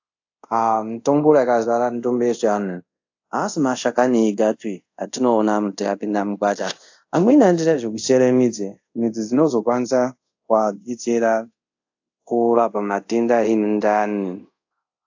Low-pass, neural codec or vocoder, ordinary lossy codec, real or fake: 7.2 kHz; codec, 24 kHz, 0.5 kbps, DualCodec; AAC, 48 kbps; fake